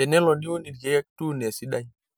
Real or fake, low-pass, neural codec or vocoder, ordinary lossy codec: real; none; none; none